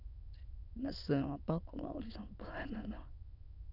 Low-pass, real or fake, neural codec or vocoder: 5.4 kHz; fake; autoencoder, 22.05 kHz, a latent of 192 numbers a frame, VITS, trained on many speakers